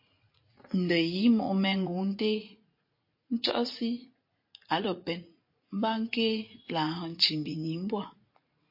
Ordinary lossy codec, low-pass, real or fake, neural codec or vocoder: MP3, 32 kbps; 5.4 kHz; fake; vocoder, 44.1 kHz, 80 mel bands, Vocos